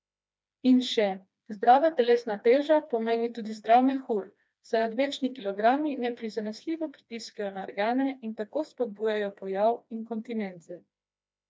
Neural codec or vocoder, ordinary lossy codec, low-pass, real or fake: codec, 16 kHz, 2 kbps, FreqCodec, smaller model; none; none; fake